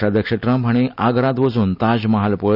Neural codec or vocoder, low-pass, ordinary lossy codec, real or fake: none; 5.4 kHz; none; real